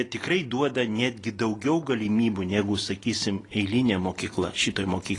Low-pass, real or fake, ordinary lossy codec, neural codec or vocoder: 10.8 kHz; real; AAC, 32 kbps; none